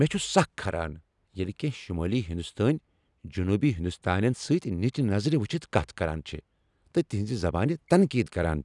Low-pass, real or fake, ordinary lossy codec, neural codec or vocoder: 10.8 kHz; real; none; none